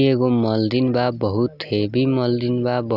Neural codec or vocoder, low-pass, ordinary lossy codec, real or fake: none; 5.4 kHz; none; real